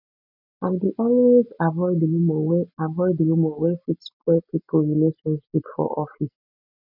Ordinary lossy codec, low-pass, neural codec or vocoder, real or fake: none; 5.4 kHz; none; real